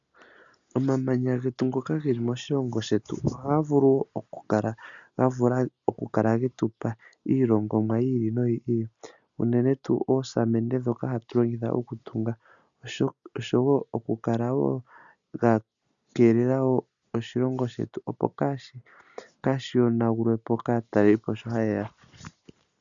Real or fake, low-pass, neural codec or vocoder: real; 7.2 kHz; none